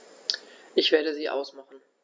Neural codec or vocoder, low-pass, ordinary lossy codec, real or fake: none; none; none; real